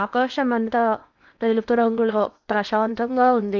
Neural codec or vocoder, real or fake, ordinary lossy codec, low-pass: codec, 16 kHz in and 24 kHz out, 0.8 kbps, FocalCodec, streaming, 65536 codes; fake; none; 7.2 kHz